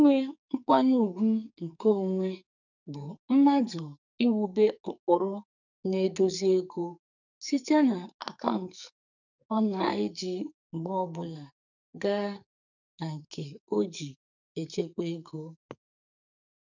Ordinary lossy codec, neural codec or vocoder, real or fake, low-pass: none; codec, 44.1 kHz, 2.6 kbps, SNAC; fake; 7.2 kHz